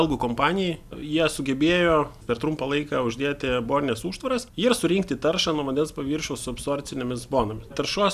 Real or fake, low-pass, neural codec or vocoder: real; 14.4 kHz; none